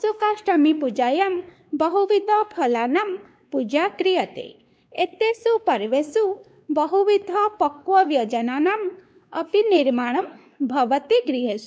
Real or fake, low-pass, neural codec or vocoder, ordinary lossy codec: fake; none; codec, 16 kHz, 2 kbps, X-Codec, WavLM features, trained on Multilingual LibriSpeech; none